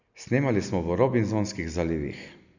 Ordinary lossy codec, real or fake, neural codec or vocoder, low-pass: none; real; none; 7.2 kHz